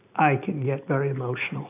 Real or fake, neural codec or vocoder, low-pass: real; none; 3.6 kHz